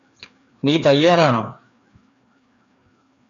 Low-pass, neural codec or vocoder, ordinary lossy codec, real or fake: 7.2 kHz; codec, 16 kHz, 2 kbps, FreqCodec, larger model; AAC, 64 kbps; fake